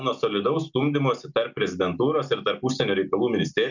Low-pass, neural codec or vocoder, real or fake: 7.2 kHz; none; real